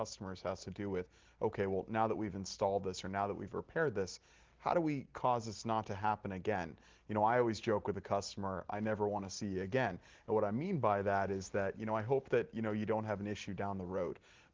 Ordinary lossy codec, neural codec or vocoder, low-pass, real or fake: Opus, 16 kbps; none; 7.2 kHz; real